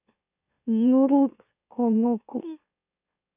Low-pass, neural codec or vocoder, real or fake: 3.6 kHz; autoencoder, 44.1 kHz, a latent of 192 numbers a frame, MeloTTS; fake